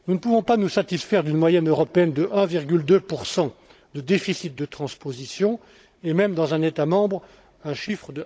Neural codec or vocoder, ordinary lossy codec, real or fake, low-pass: codec, 16 kHz, 16 kbps, FunCodec, trained on Chinese and English, 50 frames a second; none; fake; none